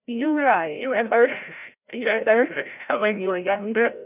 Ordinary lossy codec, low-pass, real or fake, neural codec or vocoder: none; 3.6 kHz; fake; codec, 16 kHz, 0.5 kbps, FreqCodec, larger model